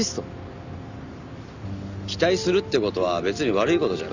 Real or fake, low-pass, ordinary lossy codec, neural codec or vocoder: real; 7.2 kHz; none; none